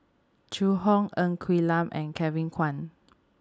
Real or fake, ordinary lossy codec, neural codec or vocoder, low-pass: real; none; none; none